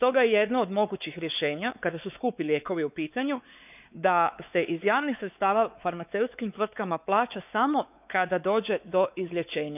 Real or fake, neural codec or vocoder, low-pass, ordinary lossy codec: fake; codec, 16 kHz, 4 kbps, X-Codec, WavLM features, trained on Multilingual LibriSpeech; 3.6 kHz; none